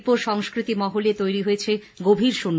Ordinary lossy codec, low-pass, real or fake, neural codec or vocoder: none; none; real; none